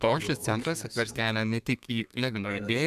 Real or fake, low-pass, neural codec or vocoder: fake; 14.4 kHz; codec, 32 kHz, 1.9 kbps, SNAC